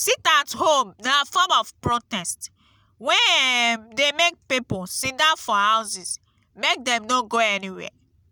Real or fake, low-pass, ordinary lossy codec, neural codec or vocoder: real; none; none; none